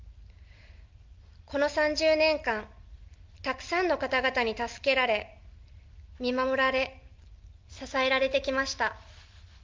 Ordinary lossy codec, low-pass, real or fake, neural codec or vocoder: Opus, 32 kbps; 7.2 kHz; real; none